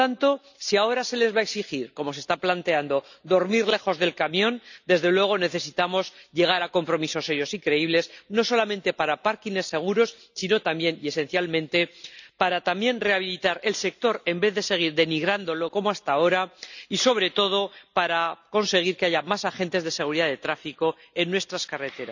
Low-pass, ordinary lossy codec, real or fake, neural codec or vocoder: 7.2 kHz; none; real; none